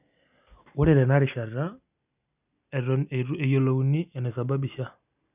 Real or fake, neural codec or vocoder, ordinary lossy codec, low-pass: real; none; none; 3.6 kHz